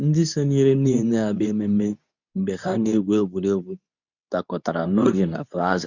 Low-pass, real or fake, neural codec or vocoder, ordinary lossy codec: 7.2 kHz; fake; codec, 24 kHz, 0.9 kbps, WavTokenizer, medium speech release version 2; none